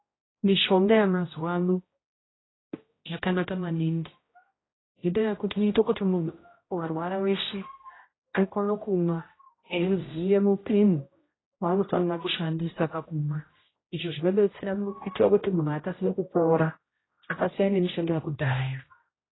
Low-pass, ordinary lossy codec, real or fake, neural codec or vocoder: 7.2 kHz; AAC, 16 kbps; fake; codec, 16 kHz, 0.5 kbps, X-Codec, HuBERT features, trained on general audio